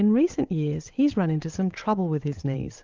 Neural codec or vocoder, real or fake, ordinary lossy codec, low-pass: vocoder, 22.05 kHz, 80 mel bands, WaveNeXt; fake; Opus, 24 kbps; 7.2 kHz